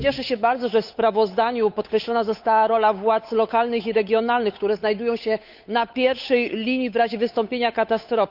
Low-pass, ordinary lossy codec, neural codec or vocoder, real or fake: 5.4 kHz; Opus, 64 kbps; codec, 16 kHz, 8 kbps, FunCodec, trained on Chinese and English, 25 frames a second; fake